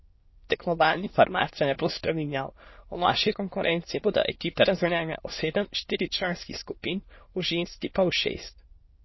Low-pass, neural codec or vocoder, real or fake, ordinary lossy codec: 7.2 kHz; autoencoder, 22.05 kHz, a latent of 192 numbers a frame, VITS, trained on many speakers; fake; MP3, 24 kbps